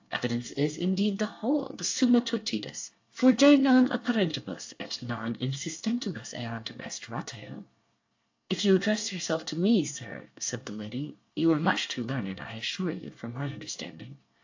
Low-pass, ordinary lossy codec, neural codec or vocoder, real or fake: 7.2 kHz; AAC, 48 kbps; codec, 24 kHz, 1 kbps, SNAC; fake